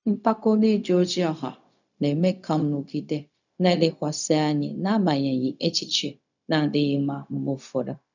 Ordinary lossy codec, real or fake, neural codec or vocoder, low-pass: none; fake; codec, 16 kHz, 0.4 kbps, LongCat-Audio-Codec; 7.2 kHz